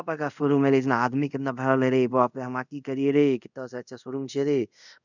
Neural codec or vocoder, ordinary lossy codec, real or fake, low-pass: codec, 24 kHz, 0.5 kbps, DualCodec; none; fake; 7.2 kHz